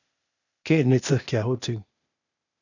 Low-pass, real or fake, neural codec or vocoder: 7.2 kHz; fake; codec, 16 kHz, 0.8 kbps, ZipCodec